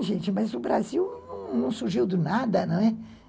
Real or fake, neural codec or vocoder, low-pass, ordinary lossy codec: real; none; none; none